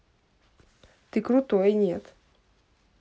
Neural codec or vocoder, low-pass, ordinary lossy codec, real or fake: none; none; none; real